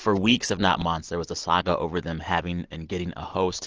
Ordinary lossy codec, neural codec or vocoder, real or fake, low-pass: Opus, 16 kbps; none; real; 7.2 kHz